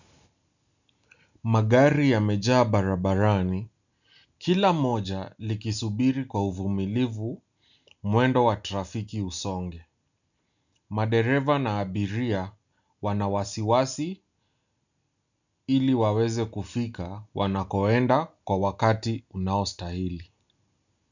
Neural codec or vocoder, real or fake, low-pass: none; real; 7.2 kHz